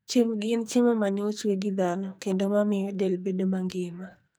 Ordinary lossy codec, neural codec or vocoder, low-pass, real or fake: none; codec, 44.1 kHz, 2.6 kbps, SNAC; none; fake